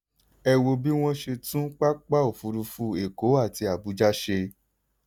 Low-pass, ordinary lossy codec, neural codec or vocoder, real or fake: none; none; none; real